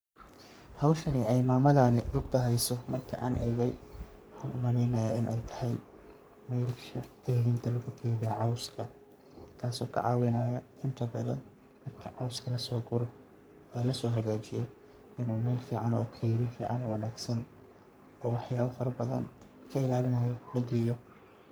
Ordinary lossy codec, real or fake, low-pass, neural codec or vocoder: none; fake; none; codec, 44.1 kHz, 3.4 kbps, Pupu-Codec